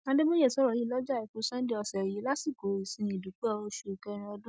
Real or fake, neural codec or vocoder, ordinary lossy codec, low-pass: real; none; none; none